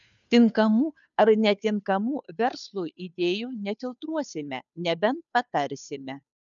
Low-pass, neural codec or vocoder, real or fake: 7.2 kHz; codec, 16 kHz, 2 kbps, FunCodec, trained on Chinese and English, 25 frames a second; fake